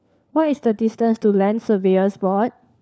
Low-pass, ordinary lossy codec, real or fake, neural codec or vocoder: none; none; fake; codec, 16 kHz, 4 kbps, FunCodec, trained on LibriTTS, 50 frames a second